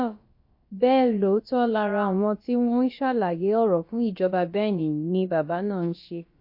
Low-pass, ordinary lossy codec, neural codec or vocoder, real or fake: 5.4 kHz; MP3, 32 kbps; codec, 16 kHz, about 1 kbps, DyCAST, with the encoder's durations; fake